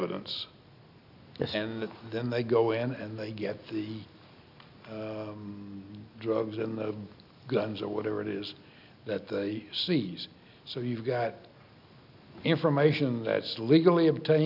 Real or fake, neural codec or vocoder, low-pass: real; none; 5.4 kHz